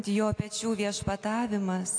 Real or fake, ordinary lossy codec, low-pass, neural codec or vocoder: real; AAC, 48 kbps; 9.9 kHz; none